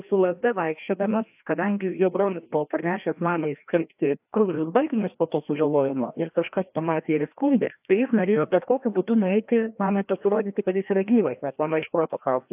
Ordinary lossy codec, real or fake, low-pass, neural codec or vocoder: AAC, 32 kbps; fake; 3.6 kHz; codec, 16 kHz, 1 kbps, FreqCodec, larger model